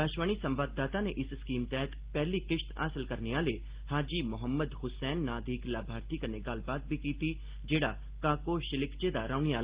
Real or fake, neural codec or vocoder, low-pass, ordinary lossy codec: real; none; 3.6 kHz; Opus, 16 kbps